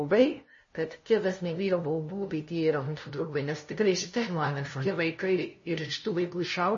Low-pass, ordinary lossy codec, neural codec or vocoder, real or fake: 7.2 kHz; MP3, 32 kbps; codec, 16 kHz, 0.5 kbps, FunCodec, trained on LibriTTS, 25 frames a second; fake